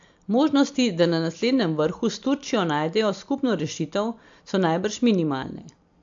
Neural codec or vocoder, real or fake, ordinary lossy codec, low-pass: none; real; AAC, 64 kbps; 7.2 kHz